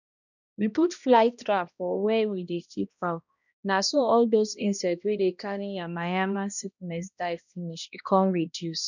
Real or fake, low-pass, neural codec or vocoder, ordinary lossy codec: fake; 7.2 kHz; codec, 16 kHz, 1 kbps, X-Codec, HuBERT features, trained on balanced general audio; none